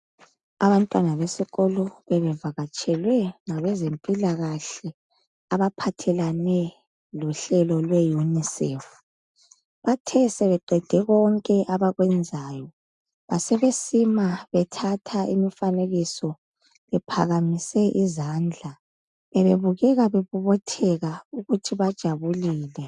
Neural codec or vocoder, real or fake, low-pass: none; real; 10.8 kHz